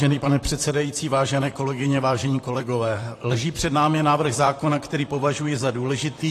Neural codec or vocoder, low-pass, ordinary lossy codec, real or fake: vocoder, 44.1 kHz, 128 mel bands, Pupu-Vocoder; 14.4 kHz; AAC, 48 kbps; fake